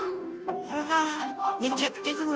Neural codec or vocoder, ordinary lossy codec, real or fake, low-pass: codec, 16 kHz, 0.5 kbps, FunCodec, trained on Chinese and English, 25 frames a second; none; fake; none